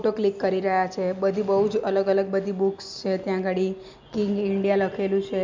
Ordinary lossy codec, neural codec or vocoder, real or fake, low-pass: MP3, 64 kbps; none; real; 7.2 kHz